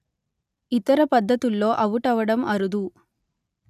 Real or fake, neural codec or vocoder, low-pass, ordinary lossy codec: real; none; 14.4 kHz; none